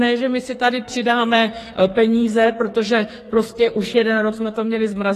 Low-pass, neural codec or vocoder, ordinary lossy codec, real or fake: 14.4 kHz; codec, 44.1 kHz, 2.6 kbps, SNAC; AAC, 48 kbps; fake